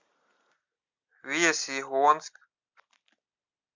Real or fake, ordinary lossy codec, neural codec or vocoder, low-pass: real; MP3, 64 kbps; none; 7.2 kHz